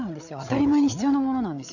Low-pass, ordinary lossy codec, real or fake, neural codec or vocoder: 7.2 kHz; none; fake; codec, 16 kHz, 8 kbps, FreqCodec, larger model